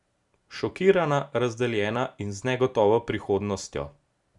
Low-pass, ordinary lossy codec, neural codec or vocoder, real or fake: 10.8 kHz; none; none; real